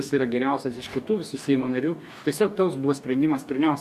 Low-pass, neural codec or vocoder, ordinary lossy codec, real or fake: 14.4 kHz; codec, 44.1 kHz, 2.6 kbps, DAC; MP3, 96 kbps; fake